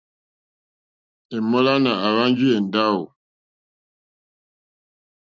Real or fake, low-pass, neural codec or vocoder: real; 7.2 kHz; none